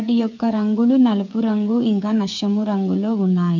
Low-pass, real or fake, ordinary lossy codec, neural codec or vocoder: 7.2 kHz; fake; MP3, 64 kbps; codec, 16 kHz, 8 kbps, FreqCodec, smaller model